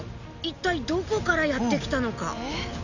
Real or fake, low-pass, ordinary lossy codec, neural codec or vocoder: real; 7.2 kHz; none; none